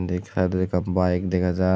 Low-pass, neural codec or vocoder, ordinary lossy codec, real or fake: none; none; none; real